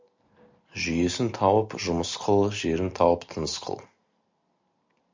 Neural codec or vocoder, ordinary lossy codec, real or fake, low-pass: none; MP3, 64 kbps; real; 7.2 kHz